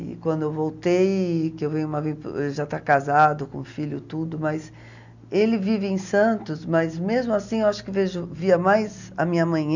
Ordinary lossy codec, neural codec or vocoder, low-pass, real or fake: none; none; 7.2 kHz; real